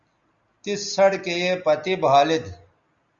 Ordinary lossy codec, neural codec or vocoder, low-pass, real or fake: Opus, 64 kbps; none; 7.2 kHz; real